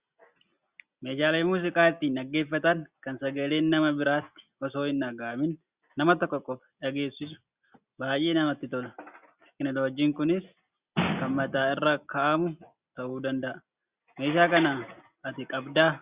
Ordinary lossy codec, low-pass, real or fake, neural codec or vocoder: Opus, 64 kbps; 3.6 kHz; real; none